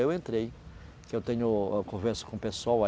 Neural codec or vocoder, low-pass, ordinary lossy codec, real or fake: none; none; none; real